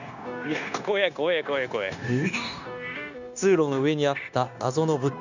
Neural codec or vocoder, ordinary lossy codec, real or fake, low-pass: codec, 16 kHz, 0.9 kbps, LongCat-Audio-Codec; none; fake; 7.2 kHz